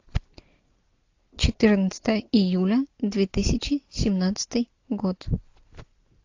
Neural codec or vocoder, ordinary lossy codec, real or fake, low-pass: none; AAC, 48 kbps; real; 7.2 kHz